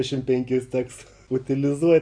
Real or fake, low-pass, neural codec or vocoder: real; 9.9 kHz; none